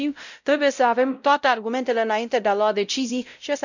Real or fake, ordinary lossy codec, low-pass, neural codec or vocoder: fake; none; 7.2 kHz; codec, 16 kHz, 0.5 kbps, X-Codec, WavLM features, trained on Multilingual LibriSpeech